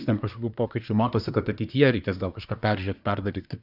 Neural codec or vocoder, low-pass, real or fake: codec, 24 kHz, 1 kbps, SNAC; 5.4 kHz; fake